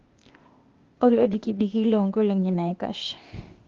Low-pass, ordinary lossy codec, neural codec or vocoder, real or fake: 7.2 kHz; Opus, 32 kbps; codec, 16 kHz, 0.8 kbps, ZipCodec; fake